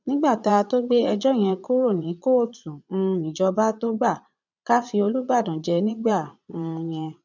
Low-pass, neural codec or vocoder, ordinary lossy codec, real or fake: 7.2 kHz; vocoder, 22.05 kHz, 80 mel bands, Vocos; none; fake